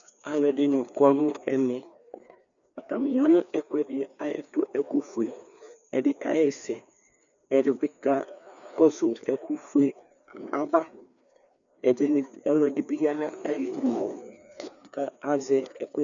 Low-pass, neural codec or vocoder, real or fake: 7.2 kHz; codec, 16 kHz, 2 kbps, FreqCodec, larger model; fake